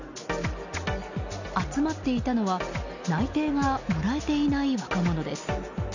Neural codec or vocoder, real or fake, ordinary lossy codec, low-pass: none; real; none; 7.2 kHz